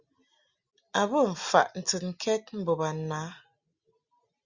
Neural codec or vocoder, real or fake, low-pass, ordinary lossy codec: none; real; 7.2 kHz; Opus, 64 kbps